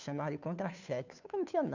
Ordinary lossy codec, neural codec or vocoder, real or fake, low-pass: none; codec, 16 kHz, 2 kbps, FunCodec, trained on Chinese and English, 25 frames a second; fake; 7.2 kHz